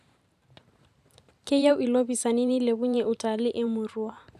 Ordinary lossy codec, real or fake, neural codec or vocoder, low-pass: none; fake; vocoder, 44.1 kHz, 128 mel bands every 512 samples, BigVGAN v2; 14.4 kHz